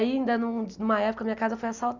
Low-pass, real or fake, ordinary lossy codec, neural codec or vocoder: 7.2 kHz; real; Opus, 64 kbps; none